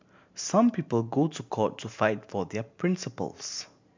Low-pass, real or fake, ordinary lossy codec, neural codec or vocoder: 7.2 kHz; fake; MP3, 64 kbps; vocoder, 44.1 kHz, 128 mel bands every 512 samples, BigVGAN v2